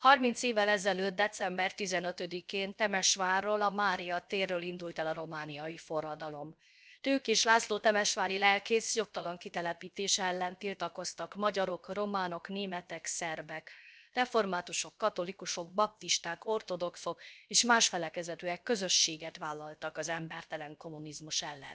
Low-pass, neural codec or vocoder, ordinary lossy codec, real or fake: none; codec, 16 kHz, 0.7 kbps, FocalCodec; none; fake